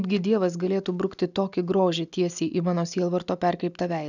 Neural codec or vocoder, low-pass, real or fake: none; 7.2 kHz; real